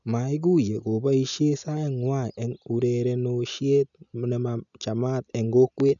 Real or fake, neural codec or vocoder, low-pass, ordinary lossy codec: real; none; 7.2 kHz; none